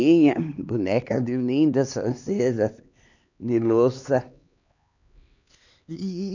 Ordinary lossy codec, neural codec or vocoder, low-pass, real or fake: none; codec, 16 kHz, 2 kbps, X-Codec, HuBERT features, trained on LibriSpeech; 7.2 kHz; fake